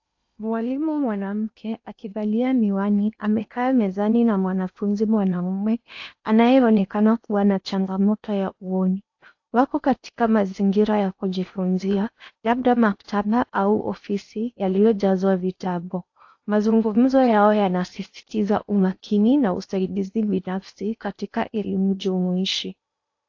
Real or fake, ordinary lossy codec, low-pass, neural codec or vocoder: fake; AAC, 48 kbps; 7.2 kHz; codec, 16 kHz in and 24 kHz out, 0.8 kbps, FocalCodec, streaming, 65536 codes